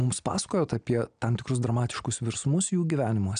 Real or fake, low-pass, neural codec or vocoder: real; 9.9 kHz; none